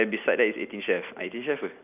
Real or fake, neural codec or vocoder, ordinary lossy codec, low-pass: real; none; none; 3.6 kHz